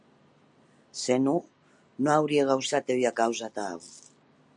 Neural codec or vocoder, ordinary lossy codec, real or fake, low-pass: none; MP3, 96 kbps; real; 9.9 kHz